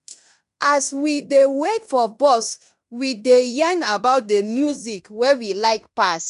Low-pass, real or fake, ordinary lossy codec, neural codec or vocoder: 10.8 kHz; fake; none; codec, 16 kHz in and 24 kHz out, 0.9 kbps, LongCat-Audio-Codec, fine tuned four codebook decoder